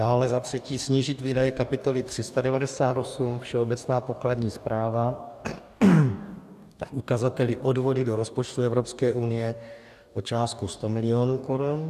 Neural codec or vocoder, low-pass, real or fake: codec, 44.1 kHz, 2.6 kbps, DAC; 14.4 kHz; fake